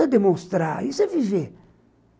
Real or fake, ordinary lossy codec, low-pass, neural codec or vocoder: real; none; none; none